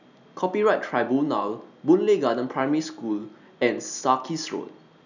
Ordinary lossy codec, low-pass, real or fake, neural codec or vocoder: none; 7.2 kHz; real; none